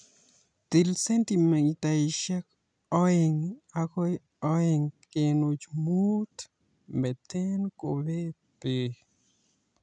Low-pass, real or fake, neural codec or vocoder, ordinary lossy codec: 9.9 kHz; real; none; none